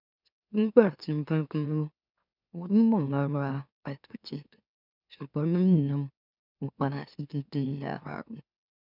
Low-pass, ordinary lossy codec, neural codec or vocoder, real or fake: 5.4 kHz; none; autoencoder, 44.1 kHz, a latent of 192 numbers a frame, MeloTTS; fake